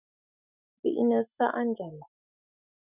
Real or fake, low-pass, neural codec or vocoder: fake; 3.6 kHz; autoencoder, 48 kHz, 128 numbers a frame, DAC-VAE, trained on Japanese speech